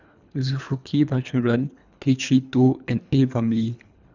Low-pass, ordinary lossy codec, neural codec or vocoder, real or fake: 7.2 kHz; none; codec, 24 kHz, 3 kbps, HILCodec; fake